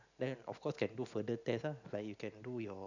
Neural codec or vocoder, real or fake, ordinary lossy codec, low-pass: none; real; none; 7.2 kHz